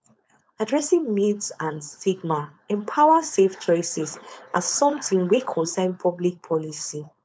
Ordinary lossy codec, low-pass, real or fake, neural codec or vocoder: none; none; fake; codec, 16 kHz, 4.8 kbps, FACodec